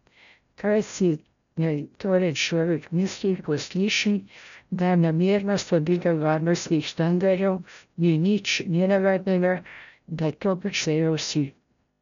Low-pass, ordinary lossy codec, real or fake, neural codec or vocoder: 7.2 kHz; none; fake; codec, 16 kHz, 0.5 kbps, FreqCodec, larger model